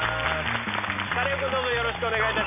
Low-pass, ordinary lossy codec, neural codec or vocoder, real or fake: 3.6 kHz; none; none; real